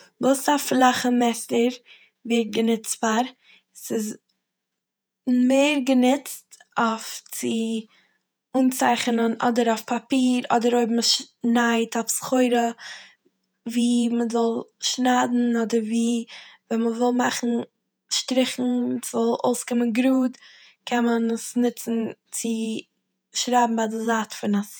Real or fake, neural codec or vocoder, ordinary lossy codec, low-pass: fake; vocoder, 48 kHz, 128 mel bands, Vocos; none; none